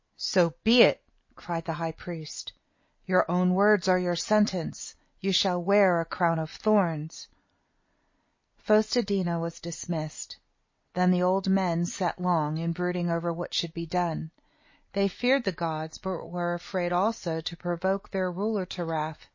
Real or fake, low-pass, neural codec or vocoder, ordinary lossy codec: real; 7.2 kHz; none; MP3, 32 kbps